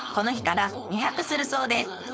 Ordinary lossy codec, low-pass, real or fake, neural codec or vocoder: none; none; fake; codec, 16 kHz, 4.8 kbps, FACodec